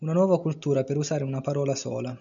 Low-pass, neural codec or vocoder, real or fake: 7.2 kHz; none; real